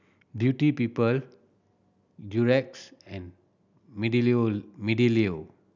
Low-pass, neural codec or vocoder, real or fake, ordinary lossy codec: 7.2 kHz; none; real; none